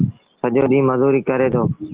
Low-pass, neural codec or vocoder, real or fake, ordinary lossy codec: 3.6 kHz; none; real; Opus, 16 kbps